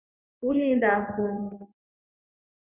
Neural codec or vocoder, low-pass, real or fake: codec, 16 kHz in and 24 kHz out, 1 kbps, XY-Tokenizer; 3.6 kHz; fake